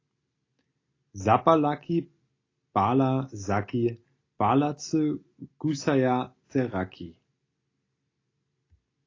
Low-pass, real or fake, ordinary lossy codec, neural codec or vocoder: 7.2 kHz; real; AAC, 32 kbps; none